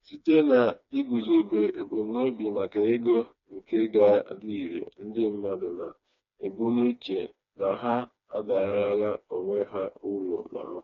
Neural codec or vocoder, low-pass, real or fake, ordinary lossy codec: codec, 16 kHz, 2 kbps, FreqCodec, smaller model; 7.2 kHz; fake; MP3, 48 kbps